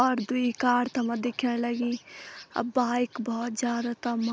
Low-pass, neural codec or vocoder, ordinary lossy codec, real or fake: none; none; none; real